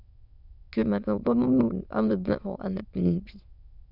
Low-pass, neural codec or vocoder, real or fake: 5.4 kHz; autoencoder, 22.05 kHz, a latent of 192 numbers a frame, VITS, trained on many speakers; fake